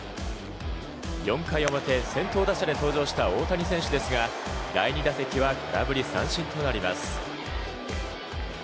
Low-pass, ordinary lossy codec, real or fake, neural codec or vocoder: none; none; real; none